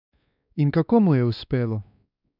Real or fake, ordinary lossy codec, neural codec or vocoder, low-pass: fake; none; codec, 16 kHz, 2 kbps, X-Codec, WavLM features, trained on Multilingual LibriSpeech; 5.4 kHz